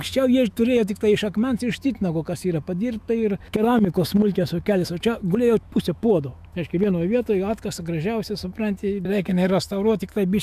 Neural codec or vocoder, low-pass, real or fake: autoencoder, 48 kHz, 128 numbers a frame, DAC-VAE, trained on Japanese speech; 14.4 kHz; fake